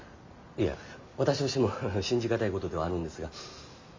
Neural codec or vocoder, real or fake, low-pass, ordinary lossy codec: none; real; 7.2 kHz; MP3, 64 kbps